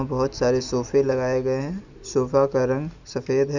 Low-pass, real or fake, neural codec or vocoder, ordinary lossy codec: 7.2 kHz; real; none; none